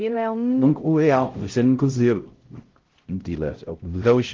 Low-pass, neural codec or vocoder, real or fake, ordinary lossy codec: 7.2 kHz; codec, 16 kHz, 0.5 kbps, X-Codec, HuBERT features, trained on LibriSpeech; fake; Opus, 16 kbps